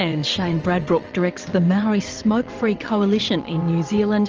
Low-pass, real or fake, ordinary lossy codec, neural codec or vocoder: 7.2 kHz; fake; Opus, 24 kbps; vocoder, 22.05 kHz, 80 mel bands, WaveNeXt